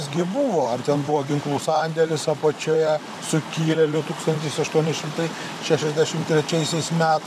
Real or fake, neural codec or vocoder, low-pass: fake; vocoder, 44.1 kHz, 128 mel bands, Pupu-Vocoder; 14.4 kHz